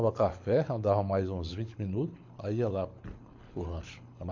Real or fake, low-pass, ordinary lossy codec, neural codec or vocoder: fake; 7.2 kHz; MP3, 48 kbps; codec, 24 kHz, 6 kbps, HILCodec